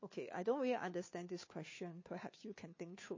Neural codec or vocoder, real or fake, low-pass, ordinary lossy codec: codec, 16 kHz, 2 kbps, FunCodec, trained on Chinese and English, 25 frames a second; fake; 7.2 kHz; MP3, 32 kbps